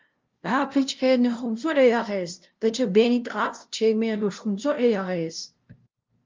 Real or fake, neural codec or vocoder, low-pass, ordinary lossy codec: fake; codec, 16 kHz, 0.5 kbps, FunCodec, trained on LibriTTS, 25 frames a second; 7.2 kHz; Opus, 32 kbps